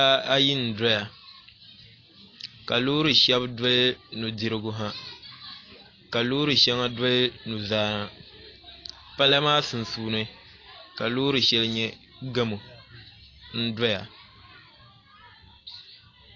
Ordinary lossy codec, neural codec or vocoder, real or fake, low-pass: AAC, 32 kbps; none; real; 7.2 kHz